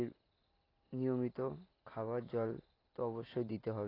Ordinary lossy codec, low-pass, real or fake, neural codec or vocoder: AAC, 24 kbps; 5.4 kHz; real; none